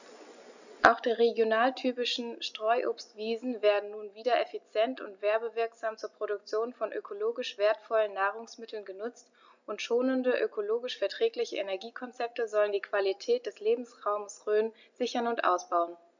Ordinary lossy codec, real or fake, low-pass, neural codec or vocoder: none; real; 7.2 kHz; none